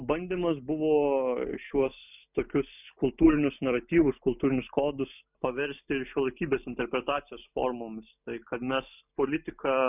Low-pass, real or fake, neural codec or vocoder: 3.6 kHz; real; none